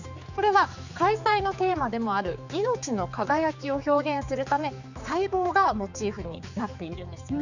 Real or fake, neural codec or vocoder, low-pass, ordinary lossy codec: fake; codec, 16 kHz, 4 kbps, X-Codec, HuBERT features, trained on general audio; 7.2 kHz; none